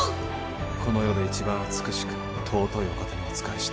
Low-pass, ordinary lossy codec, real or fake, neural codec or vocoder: none; none; real; none